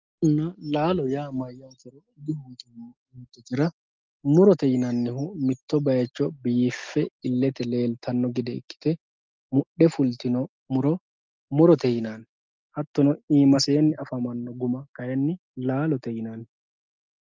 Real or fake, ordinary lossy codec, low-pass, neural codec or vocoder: real; Opus, 32 kbps; 7.2 kHz; none